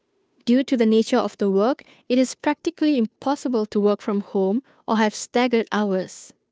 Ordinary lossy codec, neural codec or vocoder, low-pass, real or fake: none; codec, 16 kHz, 2 kbps, FunCodec, trained on Chinese and English, 25 frames a second; none; fake